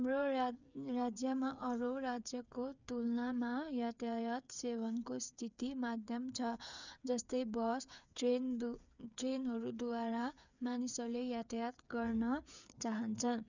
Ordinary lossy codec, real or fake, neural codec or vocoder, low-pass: none; fake; codec, 16 kHz, 8 kbps, FreqCodec, smaller model; 7.2 kHz